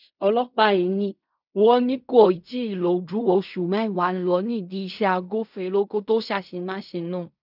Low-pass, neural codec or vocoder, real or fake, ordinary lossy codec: 5.4 kHz; codec, 16 kHz in and 24 kHz out, 0.4 kbps, LongCat-Audio-Codec, fine tuned four codebook decoder; fake; none